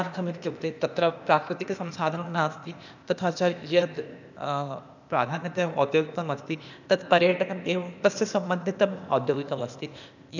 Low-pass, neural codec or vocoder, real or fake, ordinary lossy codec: 7.2 kHz; codec, 16 kHz, 0.8 kbps, ZipCodec; fake; none